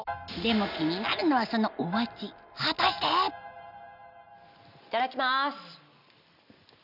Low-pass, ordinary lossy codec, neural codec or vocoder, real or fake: 5.4 kHz; none; none; real